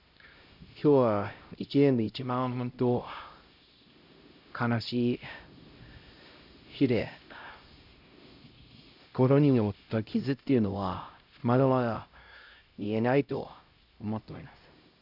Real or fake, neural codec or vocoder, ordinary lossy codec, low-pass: fake; codec, 16 kHz, 0.5 kbps, X-Codec, HuBERT features, trained on LibriSpeech; none; 5.4 kHz